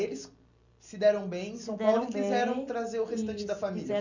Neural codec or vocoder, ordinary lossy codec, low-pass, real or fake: none; none; 7.2 kHz; real